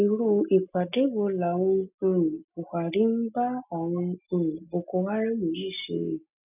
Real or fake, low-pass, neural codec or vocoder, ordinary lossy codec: real; 3.6 kHz; none; none